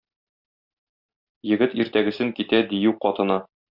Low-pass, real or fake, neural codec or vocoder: 5.4 kHz; real; none